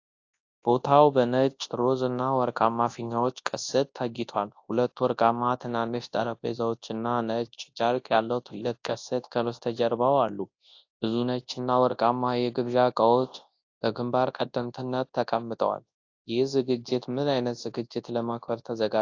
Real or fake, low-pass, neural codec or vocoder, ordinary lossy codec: fake; 7.2 kHz; codec, 24 kHz, 0.9 kbps, WavTokenizer, large speech release; AAC, 48 kbps